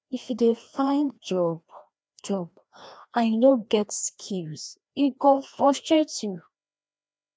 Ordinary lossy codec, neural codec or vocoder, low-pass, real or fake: none; codec, 16 kHz, 1 kbps, FreqCodec, larger model; none; fake